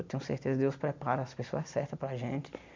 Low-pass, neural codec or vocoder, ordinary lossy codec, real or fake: 7.2 kHz; none; MP3, 64 kbps; real